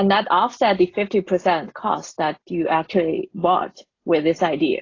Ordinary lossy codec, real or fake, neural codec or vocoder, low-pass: AAC, 32 kbps; real; none; 7.2 kHz